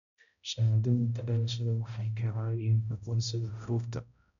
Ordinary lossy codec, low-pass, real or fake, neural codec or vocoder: none; 7.2 kHz; fake; codec, 16 kHz, 0.5 kbps, X-Codec, HuBERT features, trained on balanced general audio